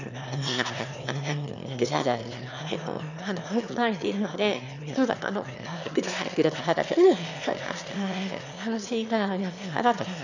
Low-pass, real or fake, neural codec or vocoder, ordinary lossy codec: 7.2 kHz; fake; autoencoder, 22.05 kHz, a latent of 192 numbers a frame, VITS, trained on one speaker; none